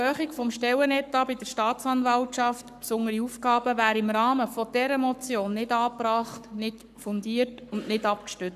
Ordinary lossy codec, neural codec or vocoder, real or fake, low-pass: none; codec, 44.1 kHz, 7.8 kbps, Pupu-Codec; fake; 14.4 kHz